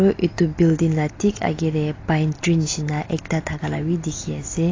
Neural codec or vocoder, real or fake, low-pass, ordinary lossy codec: none; real; 7.2 kHz; AAC, 32 kbps